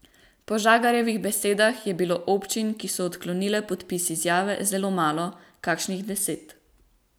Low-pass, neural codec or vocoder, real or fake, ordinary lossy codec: none; none; real; none